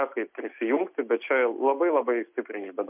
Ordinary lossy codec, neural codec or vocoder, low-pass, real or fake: MP3, 32 kbps; none; 3.6 kHz; real